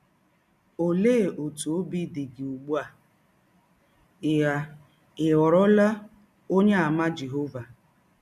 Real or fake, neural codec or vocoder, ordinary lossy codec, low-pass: real; none; none; 14.4 kHz